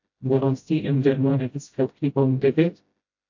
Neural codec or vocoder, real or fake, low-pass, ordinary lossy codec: codec, 16 kHz, 0.5 kbps, FreqCodec, smaller model; fake; 7.2 kHz; AAC, 48 kbps